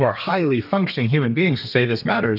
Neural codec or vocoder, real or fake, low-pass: codec, 32 kHz, 1.9 kbps, SNAC; fake; 5.4 kHz